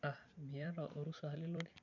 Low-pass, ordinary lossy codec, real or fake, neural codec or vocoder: 7.2 kHz; none; fake; vocoder, 44.1 kHz, 128 mel bands every 256 samples, BigVGAN v2